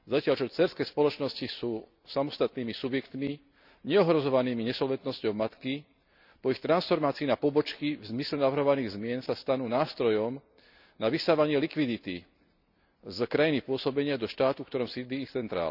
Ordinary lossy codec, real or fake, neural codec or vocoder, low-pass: none; real; none; 5.4 kHz